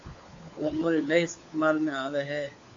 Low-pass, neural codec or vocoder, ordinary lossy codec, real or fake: 7.2 kHz; codec, 16 kHz, 4 kbps, FunCodec, trained on LibriTTS, 50 frames a second; AAC, 48 kbps; fake